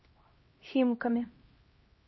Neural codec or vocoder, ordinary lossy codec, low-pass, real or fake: codec, 16 kHz, 1 kbps, X-Codec, HuBERT features, trained on LibriSpeech; MP3, 24 kbps; 7.2 kHz; fake